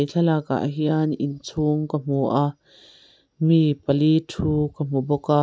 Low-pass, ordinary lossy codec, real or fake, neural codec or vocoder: none; none; real; none